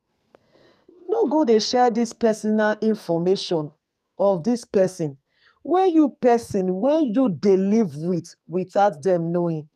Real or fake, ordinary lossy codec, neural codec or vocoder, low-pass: fake; none; codec, 44.1 kHz, 2.6 kbps, SNAC; 14.4 kHz